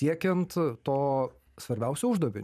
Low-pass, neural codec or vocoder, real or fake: 14.4 kHz; vocoder, 44.1 kHz, 128 mel bands every 512 samples, BigVGAN v2; fake